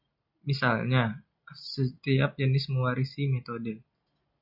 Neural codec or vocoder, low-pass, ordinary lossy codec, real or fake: none; 5.4 kHz; MP3, 48 kbps; real